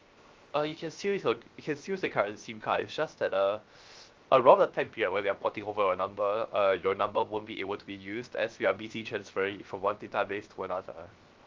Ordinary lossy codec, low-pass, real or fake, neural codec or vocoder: Opus, 32 kbps; 7.2 kHz; fake; codec, 16 kHz, 0.7 kbps, FocalCodec